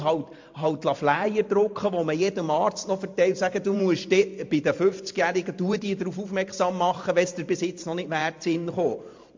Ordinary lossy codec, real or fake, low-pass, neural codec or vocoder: MP3, 48 kbps; fake; 7.2 kHz; vocoder, 44.1 kHz, 128 mel bands every 512 samples, BigVGAN v2